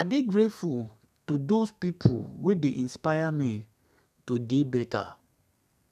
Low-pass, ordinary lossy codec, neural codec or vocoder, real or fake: 14.4 kHz; none; codec, 32 kHz, 1.9 kbps, SNAC; fake